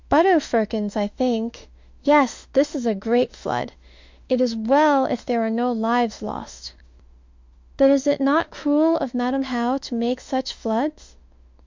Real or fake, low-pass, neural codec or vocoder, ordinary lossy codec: fake; 7.2 kHz; autoencoder, 48 kHz, 32 numbers a frame, DAC-VAE, trained on Japanese speech; MP3, 64 kbps